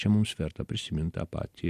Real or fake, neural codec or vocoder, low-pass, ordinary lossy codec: real; none; 14.4 kHz; MP3, 64 kbps